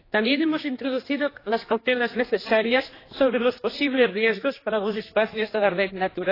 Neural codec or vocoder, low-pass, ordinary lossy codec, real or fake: codec, 16 kHz, 2 kbps, X-Codec, HuBERT features, trained on general audio; 5.4 kHz; AAC, 24 kbps; fake